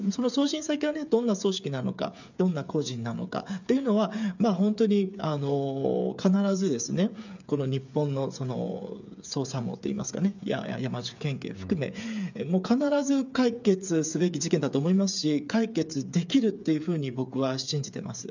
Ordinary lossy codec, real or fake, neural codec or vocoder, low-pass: none; fake; codec, 16 kHz, 8 kbps, FreqCodec, smaller model; 7.2 kHz